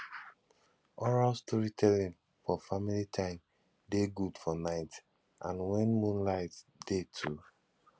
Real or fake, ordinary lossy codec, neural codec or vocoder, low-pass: real; none; none; none